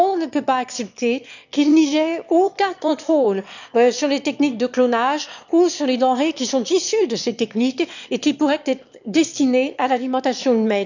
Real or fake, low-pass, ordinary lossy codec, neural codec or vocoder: fake; 7.2 kHz; none; autoencoder, 22.05 kHz, a latent of 192 numbers a frame, VITS, trained on one speaker